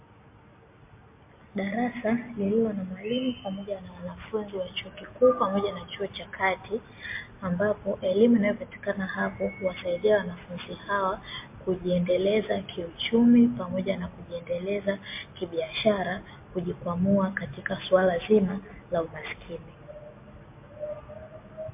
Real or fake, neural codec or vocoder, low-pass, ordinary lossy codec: real; none; 3.6 kHz; MP3, 24 kbps